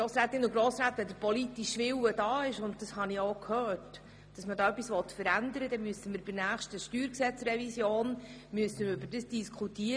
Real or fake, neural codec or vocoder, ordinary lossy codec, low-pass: real; none; none; none